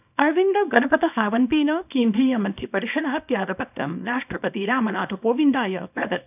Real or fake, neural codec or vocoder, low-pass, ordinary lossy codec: fake; codec, 24 kHz, 0.9 kbps, WavTokenizer, small release; 3.6 kHz; none